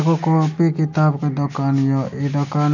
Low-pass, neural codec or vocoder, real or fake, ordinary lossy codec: 7.2 kHz; none; real; none